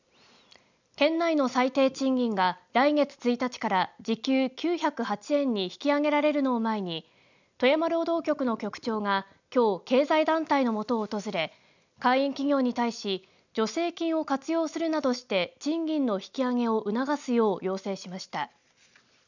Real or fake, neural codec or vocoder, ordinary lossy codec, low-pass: real; none; none; 7.2 kHz